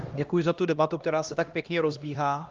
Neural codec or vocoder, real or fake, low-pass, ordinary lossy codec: codec, 16 kHz, 1 kbps, X-Codec, HuBERT features, trained on LibriSpeech; fake; 7.2 kHz; Opus, 32 kbps